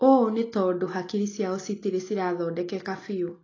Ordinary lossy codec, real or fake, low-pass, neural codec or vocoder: AAC, 32 kbps; real; 7.2 kHz; none